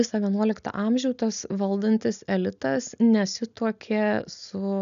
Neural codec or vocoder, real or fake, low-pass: none; real; 7.2 kHz